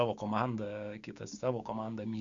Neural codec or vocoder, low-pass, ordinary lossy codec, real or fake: codec, 16 kHz, 6 kbps, DAC; 7.2 kHz; Opus, 64 kbps; fake